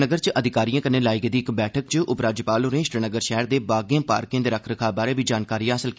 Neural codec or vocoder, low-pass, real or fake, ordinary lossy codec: none; none; real; none